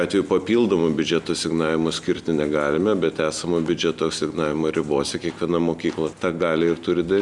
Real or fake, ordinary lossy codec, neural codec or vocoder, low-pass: real; Opus, 64 kbps; none; 10.8 kHz